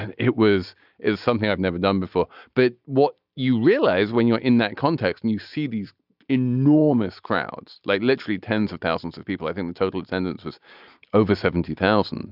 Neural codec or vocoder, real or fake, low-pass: none; real; 5.4 kHz